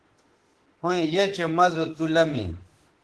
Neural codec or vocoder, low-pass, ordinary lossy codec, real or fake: autoencoder, 48 kHz, 32 numbers a frame, DAC-VAE, trained on Japanese speech; 10.8 kHz; Opus, 16 kbps; fake